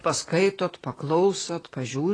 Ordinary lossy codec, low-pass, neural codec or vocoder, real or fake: AAC, 32 kbps; 9.9 kHz; vocoder, 44.1 kHz, 128 mel bands, Pupu-Vocoder; fake